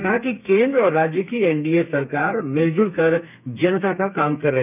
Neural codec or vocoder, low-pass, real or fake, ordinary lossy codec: codec, 32 kHz, 1.9 kbps, SNAC; 3.6 kHz; fake; none